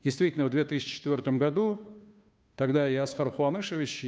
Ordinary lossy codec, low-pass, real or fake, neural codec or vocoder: none; none; fake; codec, 16 kHz, 2 kbps, FunCodec, trained on Chinese and English, 25 frames a second